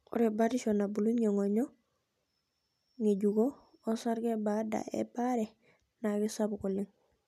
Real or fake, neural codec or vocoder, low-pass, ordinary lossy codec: real; none; 14.4 kHz; none